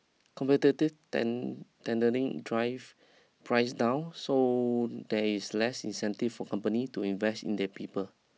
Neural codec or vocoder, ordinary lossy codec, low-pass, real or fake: none; none; none; real